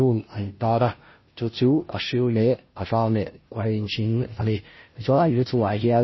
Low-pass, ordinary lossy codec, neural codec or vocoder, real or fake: 7.2 kHz; MP3, 24 kbps; codec, 16 kHz, 0.5 kbps, FunCodec, trained on Chinese and English, 25 frames a second; fake